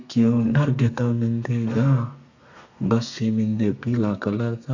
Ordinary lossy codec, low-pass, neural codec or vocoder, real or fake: none; 7.2 kHz; codec, 32 kHz, 1.9 kbps, SNAC; fake